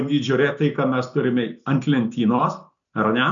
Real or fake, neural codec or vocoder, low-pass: real; none; 7.2 kHz